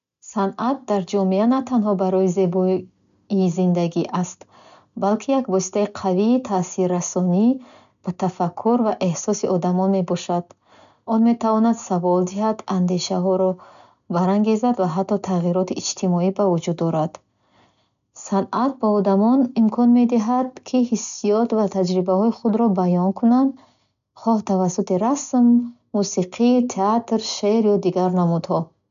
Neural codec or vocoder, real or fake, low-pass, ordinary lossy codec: none; real; 7.2 kHz; none